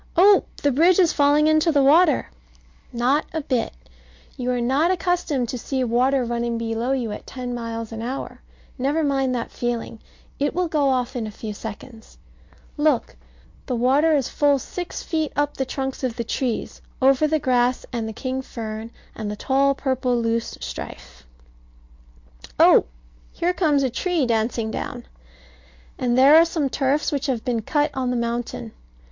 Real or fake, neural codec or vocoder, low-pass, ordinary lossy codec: real; none; 7.2 kHz; MP3, 48 kbps